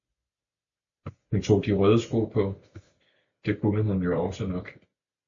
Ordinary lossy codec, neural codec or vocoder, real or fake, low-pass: AAC, 64 kbps; none; real; 7.2 kHz